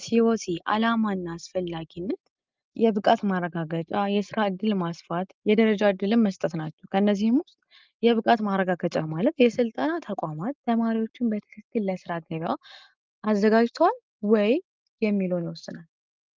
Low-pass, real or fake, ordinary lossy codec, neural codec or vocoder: 7.2 kHz; real; Opus, 32 kbps; none